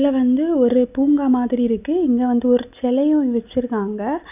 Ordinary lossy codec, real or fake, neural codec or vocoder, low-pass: none; real; none; 3.6 kHz